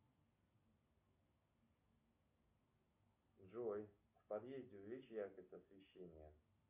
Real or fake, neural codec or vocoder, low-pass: real; none; 3.6 kHz